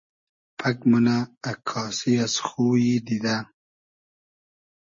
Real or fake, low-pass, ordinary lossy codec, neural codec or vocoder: real; 7.2 kHz; MP3, 32 kbps; none